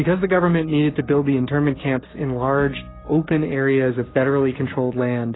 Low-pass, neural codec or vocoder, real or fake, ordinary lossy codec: 7.2 kHz; codec, 16 kHz, 6 kbps, DAC; fake; AAC, 16 kbps